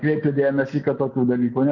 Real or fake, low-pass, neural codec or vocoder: real; 7.2 kHz; none